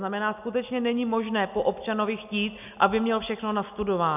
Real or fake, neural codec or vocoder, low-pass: real; none; 3.6 kHz